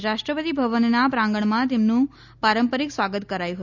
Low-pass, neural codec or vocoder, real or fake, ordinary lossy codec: 7.2 kHz; none; real; none